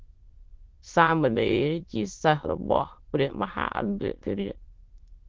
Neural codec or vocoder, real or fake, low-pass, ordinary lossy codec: autoencoder, 22.05 kHz, a latent of 192 numbers a frame, VITS, trained on many speakers; fake; 7.2 kHz; Opus, 16 kbps